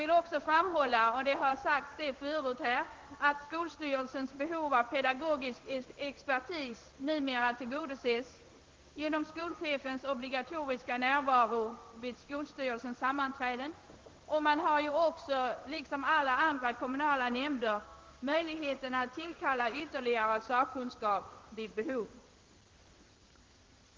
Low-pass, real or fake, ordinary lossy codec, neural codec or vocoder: 7.2 kHz; fake; Opus, 16 kbps; codec, 16 kHz in and 24 kHz out, 1 kbps, XY-Tokenizer